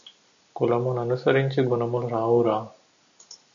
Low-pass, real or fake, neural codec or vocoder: 7.2 kHz; real; none